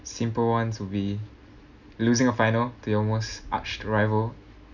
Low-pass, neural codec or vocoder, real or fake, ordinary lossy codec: 7.2 kHz; none; real; none